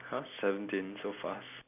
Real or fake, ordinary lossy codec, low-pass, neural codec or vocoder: real; none; 3.6 kHz; none